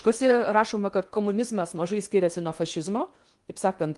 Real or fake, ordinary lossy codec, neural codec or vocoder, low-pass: fake; Opus, 32 kbps; codec, 16 kHz in and 24 kHz out, 0.8 kbps, FocalCodec, streaming, 65536 codes; 10.8 kHz